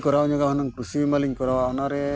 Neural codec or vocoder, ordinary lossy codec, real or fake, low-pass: none; none; real; none